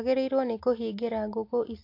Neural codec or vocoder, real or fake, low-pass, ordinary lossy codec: none; real; 5.4 kHz; AAC, 32 kbps